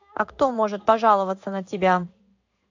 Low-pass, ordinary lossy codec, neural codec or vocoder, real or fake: 7.2 kHz; AAC, 48 kbps; codec, 16 kHz in and 24 kHz out, 1 kbps, XY-Tokenizer; fake